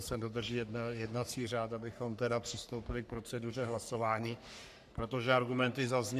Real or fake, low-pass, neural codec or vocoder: fake; 14.4 kHz; codec, 44.1 kHz, 3.4 kbps, Pupu-Codec